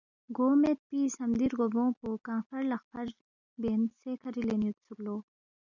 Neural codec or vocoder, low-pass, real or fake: none; 7.2 kHz; real